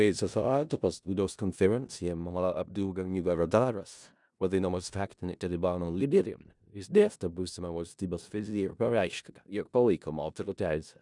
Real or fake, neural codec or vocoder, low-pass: fake; codec, 16 kHz in and 24 kHz out, 0.4 kbps, LongCat-Audio-Codec, four codebook decoder; 10.8 kHz